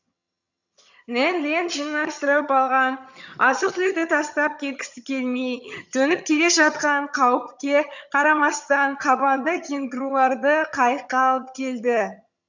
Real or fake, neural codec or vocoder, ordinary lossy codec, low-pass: fake; vocoder, 22.05 kHz, 80 mel bands, HiFi-GAN; none; 7.2 kHz